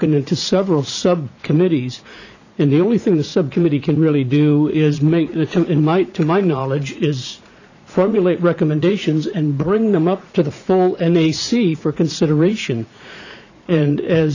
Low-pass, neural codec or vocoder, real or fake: 7.2 kHz; vocoder, 44.1 kHz, 80 mel bands, Vocos; fake